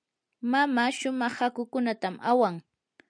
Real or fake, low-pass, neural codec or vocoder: real; 9.9 kHz; none